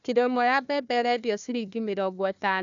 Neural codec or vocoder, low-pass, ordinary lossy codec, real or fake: codec, 16 kHz, 1 kbps, FunCodec, trained on Chinese and English, 50 frames a second; 7.2 kHz; none; fake